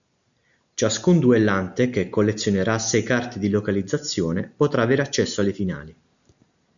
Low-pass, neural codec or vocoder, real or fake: 7.2 kHz; none; real